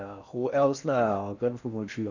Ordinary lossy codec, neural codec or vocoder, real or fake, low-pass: none; codec, 16 kHz in and 24 kHz out, 0.8 kbps, FocalCodec, streaming, 65536 codes; fake; 7.2 kHz